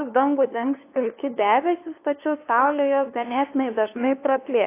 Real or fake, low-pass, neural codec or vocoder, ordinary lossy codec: fake; 3.6 kHz; codec, 16 kHz, 2 kbps, FunCodec, trained on LibriTTS, 25 frames a second; AAC, 24 kbps